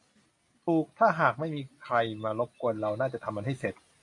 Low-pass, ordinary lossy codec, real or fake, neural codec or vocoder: 10.8 kHz; AAC, 48 kbps; real; none